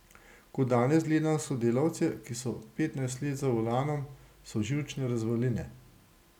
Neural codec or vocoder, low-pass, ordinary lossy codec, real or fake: none; 19.8 kHz; none; real